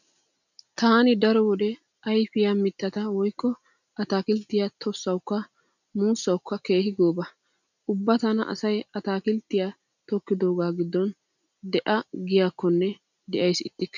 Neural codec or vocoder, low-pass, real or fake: none; 7.2 kHz; real